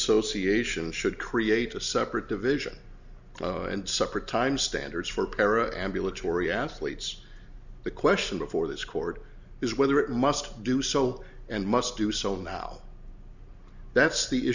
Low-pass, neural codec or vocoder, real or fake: 7.2 kHz; none; real